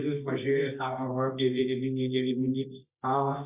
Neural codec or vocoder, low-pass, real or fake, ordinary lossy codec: codec, 24 kHz, 0.9 kbps, WavTokenizer, medium music audio release; 3.6 kHz; fake; Opus, 64 kbps